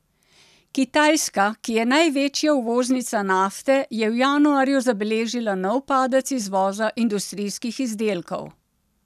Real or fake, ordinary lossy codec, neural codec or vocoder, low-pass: real; none; none; 14.4 kHz